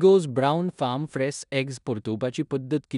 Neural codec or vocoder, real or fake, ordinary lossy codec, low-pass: codec, 16 kHz in and 24 kHz out, 0.9 kbps, LongCat-Audio-Codec, four codebook decoder; fake; none; 10.8 kHz